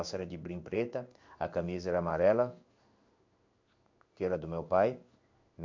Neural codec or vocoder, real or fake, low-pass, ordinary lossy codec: codec, 16 kHz in and 24 kHz out, 1 kbps, XY-Tokenizer; fake; 7.2 kHz; MP3, 64 kbps